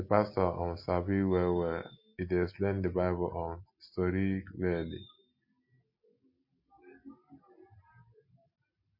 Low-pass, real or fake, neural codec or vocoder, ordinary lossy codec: 5.4 kHz; real; none; MP3, 32 kbps